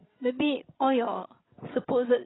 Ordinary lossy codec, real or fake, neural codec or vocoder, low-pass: AAC, 16 kbps; fake; codec, 16 kHz, 16 kbps, FreqCodec, larger model; 7.2 kHz